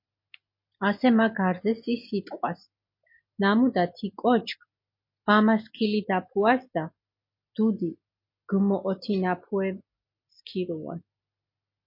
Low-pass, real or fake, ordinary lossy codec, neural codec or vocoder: 5.4 kHz; real; MP3, 32 kbps; none